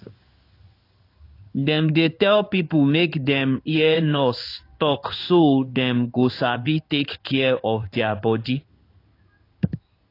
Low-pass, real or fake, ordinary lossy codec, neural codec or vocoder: 5.4 kHz; fake; AAC, 32 kbps; codec, 16 kHz in and 24 kHz out, 1 kbps, XY-Tokenizer